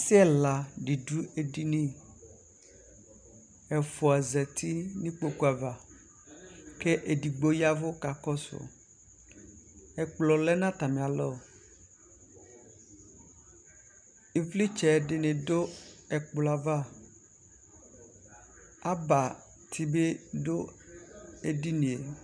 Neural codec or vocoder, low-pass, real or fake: none; 9.9 kHz; real